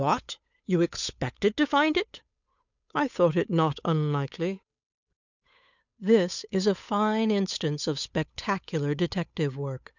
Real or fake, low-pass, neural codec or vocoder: fake; 7.2 kHz; codec, 16 kHz, 8 kbps, FunCodec, trained on Chinese and English, 25 frames a second